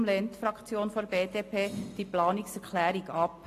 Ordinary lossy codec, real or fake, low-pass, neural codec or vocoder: AAC, 48 kbps; real; 14.4 kHz; none